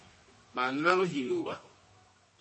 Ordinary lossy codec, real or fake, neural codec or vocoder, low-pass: MP3, 32 kbps; fake; codec, 24 kHz, 0.9 kbps, WavTokenizer, medium music audio release; 10.8 kHz